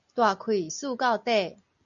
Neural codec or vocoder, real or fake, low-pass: none; real; 7.2 kHz